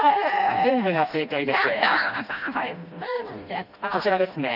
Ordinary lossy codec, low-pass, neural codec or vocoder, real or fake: none; 5.4 kHz; codec, 16 kHz, 1 kbps, FreqCodec, smaller model; fake